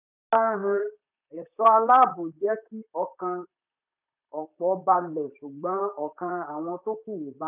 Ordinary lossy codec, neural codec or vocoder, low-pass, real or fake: none; vocoder, 44.1 kHz, 128 mel bands, Pupu-Vocoder; 3.6 kHz; fake